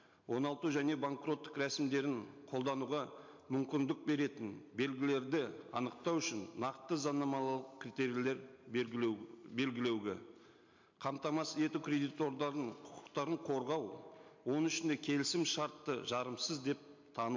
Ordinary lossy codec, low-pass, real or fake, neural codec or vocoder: MP3, 48 kbps; 7.2 kHz; real; none